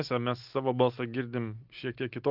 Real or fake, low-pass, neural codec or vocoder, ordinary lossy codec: real; 5.4 kHz; none; Opus, 32 kbps